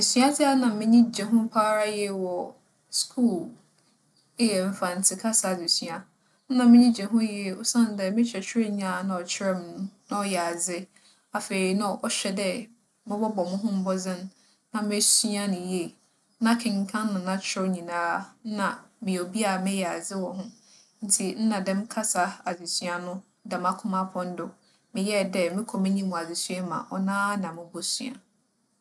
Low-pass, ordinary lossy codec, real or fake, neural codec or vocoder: none; none; real; none